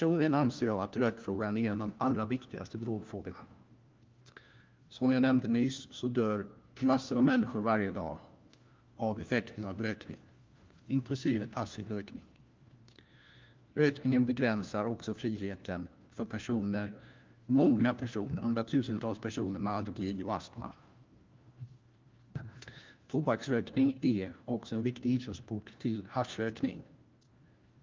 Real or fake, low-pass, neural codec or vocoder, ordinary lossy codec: fake; 7.2 kHz; codec, 16 kHz, 1 kbps, FunCodec, trained on LibriTTS, 50 frames a second; Opus, 32 kbps